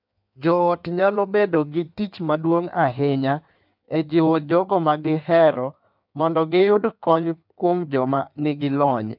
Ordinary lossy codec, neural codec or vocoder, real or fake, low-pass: none; codec, 16 kHz in and 24 kHz out, 1.1 kbps, FireRedTTS-2 codec; fake; 5.4 kHz